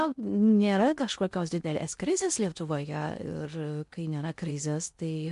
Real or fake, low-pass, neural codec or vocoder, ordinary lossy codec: fake; 10.8 kHz; codec, 16 kHz in and 24 kHz out, 0.6 kbps, FocalCodec, streaming, 2048 codes; MP3, 64 kbps